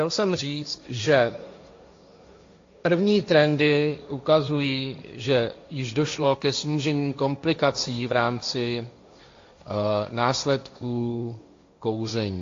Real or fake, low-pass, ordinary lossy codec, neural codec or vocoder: fake; 7.2 kHz; AAC, 48 kbps; codec, 16 kHz, 1.1 kbps, Voila-Tokenizer